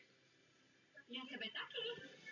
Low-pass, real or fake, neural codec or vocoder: 7.2 kHz; real; none